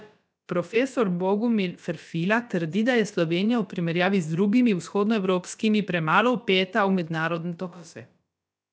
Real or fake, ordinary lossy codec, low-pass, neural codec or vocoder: fake; none; none; codec, 16 kHz, about 1 kbps, DyCAST, with the encoder's durations